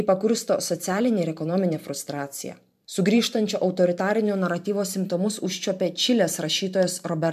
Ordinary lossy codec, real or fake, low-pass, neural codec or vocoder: MP3, 96 kbps; fake; 14.4 kHz; vocoder, 44.1 kHz, 128 mel bands every 512 samples, BigVGAN v2